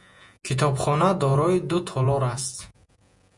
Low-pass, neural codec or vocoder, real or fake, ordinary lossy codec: 10.8 kHz; vocoder, 48 kHz, 128 mel bands, Vocos; fake; MP3, 96 kbps